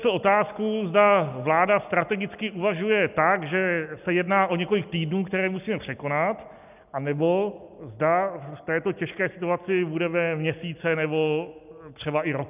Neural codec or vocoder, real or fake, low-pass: none; real; 3.6 kHz